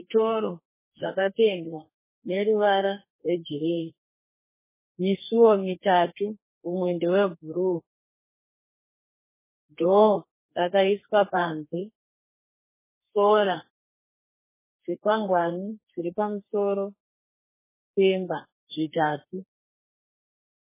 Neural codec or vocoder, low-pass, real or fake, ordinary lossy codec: codec, 32 kHz, 1.9 kbps, SNAC; 3.6 kHz; fake; MP3, 16 kbps